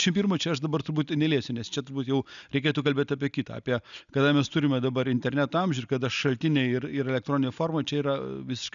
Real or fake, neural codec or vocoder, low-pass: real; none; 7.2 kHz